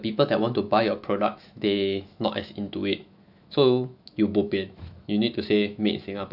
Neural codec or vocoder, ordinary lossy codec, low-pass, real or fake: autoencoder, 48 kHz, 128 numbers a frame, DAC-VAE, trained on Japanese speech; none; 5.4 kHz; fake